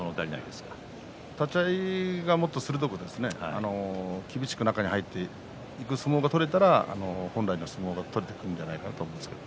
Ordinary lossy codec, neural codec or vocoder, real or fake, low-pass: none; none; real; none